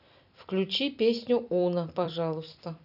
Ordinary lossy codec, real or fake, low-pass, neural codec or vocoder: none; fake; 5.4 kHz; vocoder, 44.1 kHz, 128 mel bands every 256 samples, BigVGAN v2